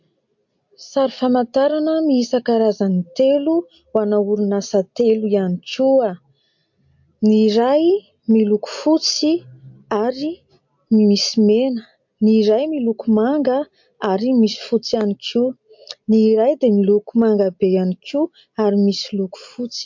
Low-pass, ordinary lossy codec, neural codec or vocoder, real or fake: 7.2 kHz; MP3, 48 kbps; none; real